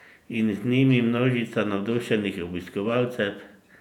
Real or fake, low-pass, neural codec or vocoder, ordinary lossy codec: fake; 19.8 kHz; vocoder, 48 kHz, 128 mel bands, Vocos; none